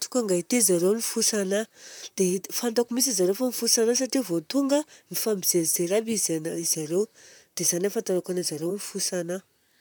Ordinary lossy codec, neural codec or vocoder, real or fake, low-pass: none; vocoder, 44.1 kHz, 128 mel bands, Pupu-Vocoder; fake; none